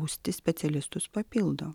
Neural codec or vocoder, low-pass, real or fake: none; 19.8 kHz; real